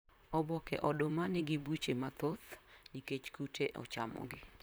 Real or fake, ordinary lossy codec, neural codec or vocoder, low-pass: fake; none; vocoder, 44.1 kHz, 128 mel bands, Pupu-Vocoder; none